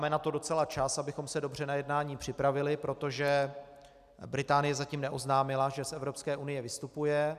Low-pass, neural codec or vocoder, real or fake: 14.4 kHz; none; real